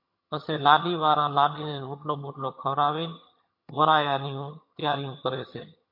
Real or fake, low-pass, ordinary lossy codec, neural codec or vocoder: fake; 5.4 kHz; MP3, 32 kbps; vocoder, 22.05 kHz, 80 mel bands, HiFi-GAN